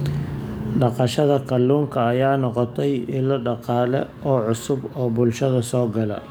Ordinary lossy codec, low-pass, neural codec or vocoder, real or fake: none; none; codec, 44.1 kHz, 7.8 kbps, DAC; fake